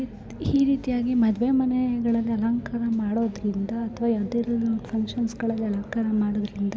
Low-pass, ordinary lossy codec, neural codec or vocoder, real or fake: none; none; none; real